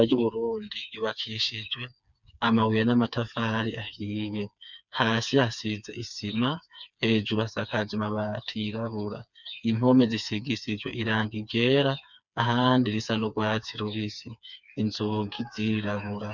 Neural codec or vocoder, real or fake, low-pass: codec, 16 kHz, 4 kbps, FreqCodec, smaller model; fake; 7.2 kHz